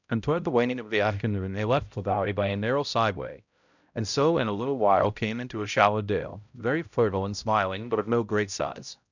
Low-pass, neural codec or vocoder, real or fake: 7.2 kHz; codec, 16 kHz, 0.5 kbps, X-Codec, HuBERT features, trained on balanced general audio; fake